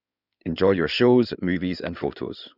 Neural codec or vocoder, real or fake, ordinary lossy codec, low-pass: codec, 16 kHz in and 24 kHz out, 2.2 kbps, FireRedTTS-2 codec; fake; none; 5.4 kHz